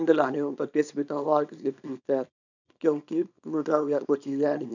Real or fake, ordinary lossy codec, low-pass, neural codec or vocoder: fake; none; 7.2 kHz; codec, 24 kHz, 0.9 kbps, WavTokenizer, small release